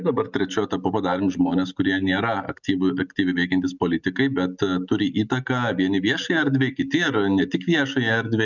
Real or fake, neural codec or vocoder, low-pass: real; none; 7.2 kHz